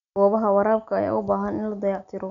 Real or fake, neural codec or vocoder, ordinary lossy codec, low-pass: real; none; none; 7.2 kHz